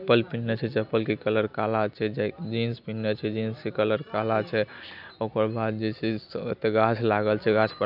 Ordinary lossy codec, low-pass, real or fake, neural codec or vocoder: none; 5.4 kHz; real; none